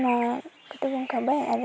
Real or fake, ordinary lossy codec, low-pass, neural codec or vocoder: real; none; none; none